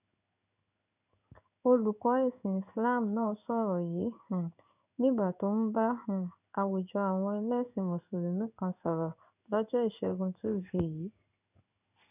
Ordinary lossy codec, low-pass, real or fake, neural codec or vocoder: none; 3.6 kHz; fake; codec, 16 kHz in and 24 kHz out, 1 kbps, XY-Tokenizer